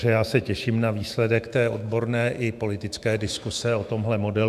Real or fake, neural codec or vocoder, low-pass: fake; autoencoder, 48 kHz, 128 numbers a frame, DAC-VAE, trained on Japanese speech; 14.4 kHz